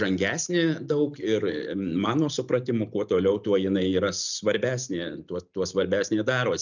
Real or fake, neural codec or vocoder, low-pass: real; none; 7.2 kHz